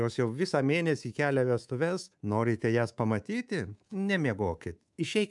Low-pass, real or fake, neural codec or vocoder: 10.8 kHz; fake; codec, 24 kHz, 3.1 kbps, DualCodec